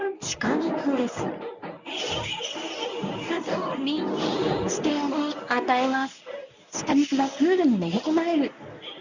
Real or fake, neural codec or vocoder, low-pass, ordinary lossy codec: fake; codec, 24 kHz, 0.9 kbps, WavTokenizer, medium speech release version 1; 7.2 kHz; none